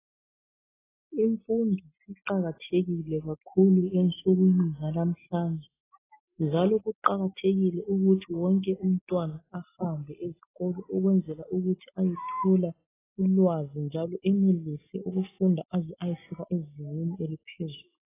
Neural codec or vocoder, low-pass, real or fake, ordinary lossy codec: none; 3.6 kHz; real; AAC, 16 kbps